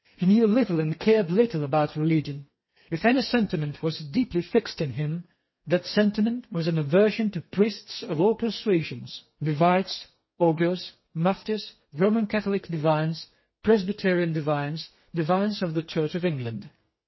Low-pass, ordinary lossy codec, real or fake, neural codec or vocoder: 7.2 kHz; MP3, 24 kbps; fake; codec, 32 kHz, 1.9 kbps, SNAC